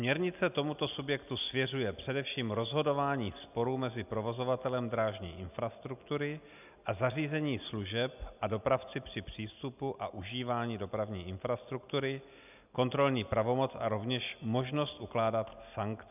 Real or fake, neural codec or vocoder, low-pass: real; none; 3.6 kHz